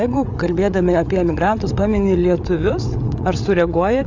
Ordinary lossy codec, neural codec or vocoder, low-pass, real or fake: AAC, 48 kbps; codec, 16 kHz, 8 kbps, FreqCodec, larger model; 7.2 kHz; fake